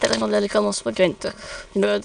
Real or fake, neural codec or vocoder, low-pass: fake; autoencoder, 22.05 kHz, a latent of 192 numbers a frame, VITS, trained on many speakers; 9.9 kHz